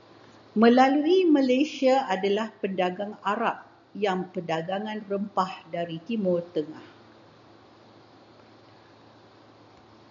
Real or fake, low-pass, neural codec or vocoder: real; 7.2 kHz; none